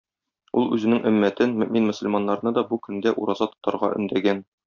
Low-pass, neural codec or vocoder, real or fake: 7.2 kHz; none; real